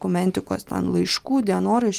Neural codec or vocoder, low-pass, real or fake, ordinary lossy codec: none; 14.4 kHz; real; Opus, 32 kbps